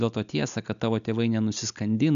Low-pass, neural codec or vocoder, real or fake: 7.2 kHz; none; real